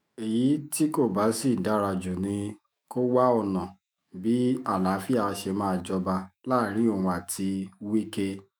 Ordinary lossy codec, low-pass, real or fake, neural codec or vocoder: none; none; fake; autoencoder, 48 kHz, 128 numbers a frame, DAC-VAE, trained on Japanese speech